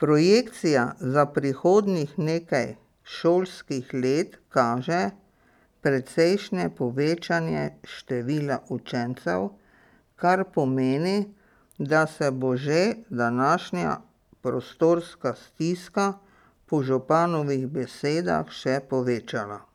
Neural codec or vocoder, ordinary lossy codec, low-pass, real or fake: none; none; 19.8 kHz; real